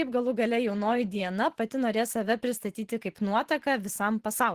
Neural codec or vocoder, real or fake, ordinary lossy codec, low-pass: vocoder, 44.1 kHz, 128 mel bands every 512 samples, BigVGAN v2; fake; Opus, 16 kbps; 14.4 kHz